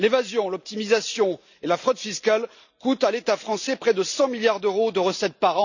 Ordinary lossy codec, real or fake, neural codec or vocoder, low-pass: none; real; none; 7.2 kHz